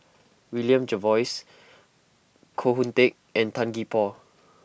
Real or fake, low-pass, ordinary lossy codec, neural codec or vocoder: real; none; none; none